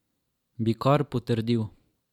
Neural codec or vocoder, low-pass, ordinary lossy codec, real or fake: vocoder, 44.1 kHz, 128 mel bands every 512 samples, BigVGAN v2; 19.8 kHz; none; fake